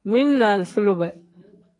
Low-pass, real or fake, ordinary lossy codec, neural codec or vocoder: 10.8 kHz; fake; AAC, 48 kbps; codec, 44.1 kHz, 2.6 kbps, SNAC